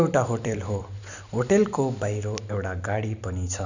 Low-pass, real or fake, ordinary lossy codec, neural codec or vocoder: 7.2 kHz; fake; none; vocoder, 44.1 kHz, 128 mel bands every 256 samples, BigVGAN v2